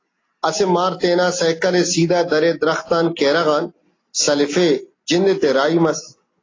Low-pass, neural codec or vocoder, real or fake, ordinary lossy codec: 7.2 kHz; none; real; AAC, 32 kbps